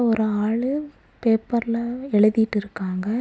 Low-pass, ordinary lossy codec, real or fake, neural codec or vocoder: none; none; real; none